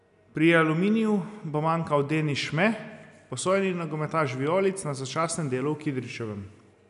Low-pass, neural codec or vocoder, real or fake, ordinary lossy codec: 10.8 kHz; none; real; none